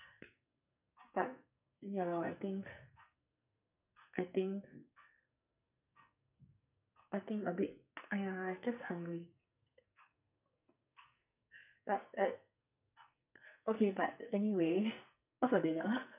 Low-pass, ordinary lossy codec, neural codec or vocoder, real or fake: 3.6 kHz; none; codec, 44.1 kHz, 2.6 kbps, SNAC; fake